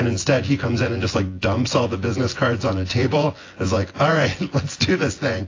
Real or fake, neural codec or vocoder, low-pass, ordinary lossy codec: fake; vocoder, 24 kHz, 100 mel bands, Vocos; 7.2 kHz; AAC, 32 kbps